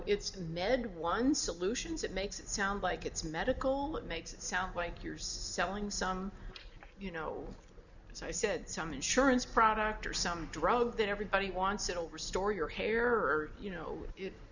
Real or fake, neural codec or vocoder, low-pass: real; none; 7.2 kHz